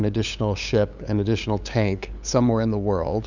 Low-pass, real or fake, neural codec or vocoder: 7.2 kHz; fake; codec, 16 kHz, 8 kbps, FunCodec, trained on LibriTTS, 25 frames a second